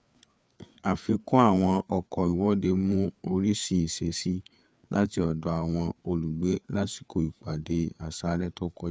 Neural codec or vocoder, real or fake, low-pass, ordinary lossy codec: codec, 16 kHz, 4 kbps, FreqCodec, larger model; fake; none; none